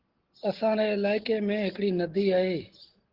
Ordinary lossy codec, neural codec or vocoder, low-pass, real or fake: Opus, 16 kbps; none; 5.4 kHz; real